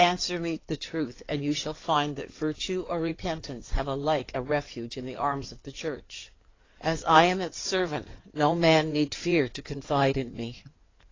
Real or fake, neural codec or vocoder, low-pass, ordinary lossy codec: fake; codec, 16 kHz in and 24 kHz out, 2.2 kbps, FireRedTTS-2 codec; 7.2 kHz; AAC, 32 kbps